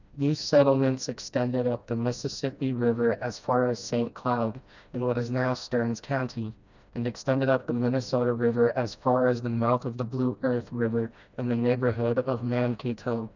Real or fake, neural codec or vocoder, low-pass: fake; codec, 16 kHz, 1 kbps, FreqCodec, smaller model; 7.2 kHz